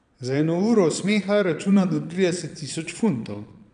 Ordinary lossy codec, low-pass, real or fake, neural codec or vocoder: none; 9.9 kHz; fake; vocoder, 22.05 kHz, 80 mel bands, Vocos